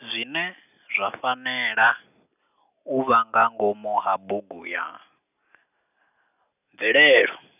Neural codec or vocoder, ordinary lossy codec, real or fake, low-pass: none; none; real; 3.6 kHz